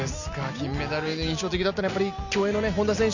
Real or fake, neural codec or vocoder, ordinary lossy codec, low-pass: real; none; none; 7.2 kHz